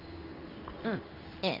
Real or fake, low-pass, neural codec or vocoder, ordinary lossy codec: fake; 5.4 kHz; codec, 16 kHz in and 24 kHz out, 1 kbps, XY-Tokenizer; none